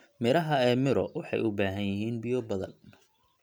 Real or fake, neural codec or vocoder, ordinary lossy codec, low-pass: real; none; none; none